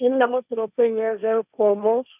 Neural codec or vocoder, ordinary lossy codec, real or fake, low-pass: codec, 16 kHz, 1.1 kbps, Voila-Tokenizer; none; fake; 3.6 kHz